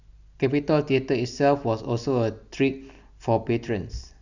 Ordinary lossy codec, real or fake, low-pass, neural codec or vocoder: none; real; 7.2 kHz; none